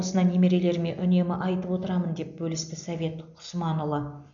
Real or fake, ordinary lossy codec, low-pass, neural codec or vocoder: real; none; 7.2 kHz; none